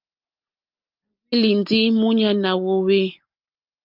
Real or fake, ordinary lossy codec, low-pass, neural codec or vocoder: real; Opus, 24 kbps; 5.4 kHz; none